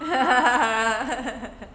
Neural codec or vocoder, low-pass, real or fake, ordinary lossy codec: none; none; real; none